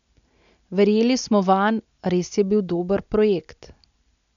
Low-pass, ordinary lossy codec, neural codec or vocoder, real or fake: 7.2 kHz; none; none; real